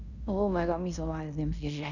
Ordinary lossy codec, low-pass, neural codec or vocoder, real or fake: none; 7.2 kHz; codec, 16 kHz in and 24 kHz out, 0.9 kbps, LongCat-Audio-Codec, fine tuned four codebook decoder; fake